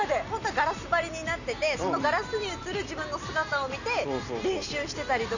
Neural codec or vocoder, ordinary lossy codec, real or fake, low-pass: none; none; real; 7.2 kHz